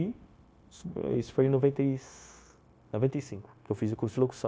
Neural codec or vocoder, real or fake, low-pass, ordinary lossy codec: codec, 16 kHz, 0.9 kbps, LongCat-Audio-Codec; fake; none; none